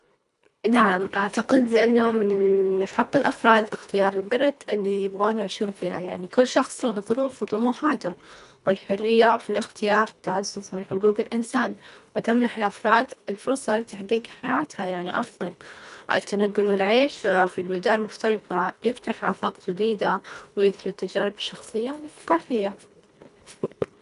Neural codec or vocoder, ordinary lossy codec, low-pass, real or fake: codec, 24 kHz, 1.5 kbps, HILCodec; none; 10.8 kHz; fake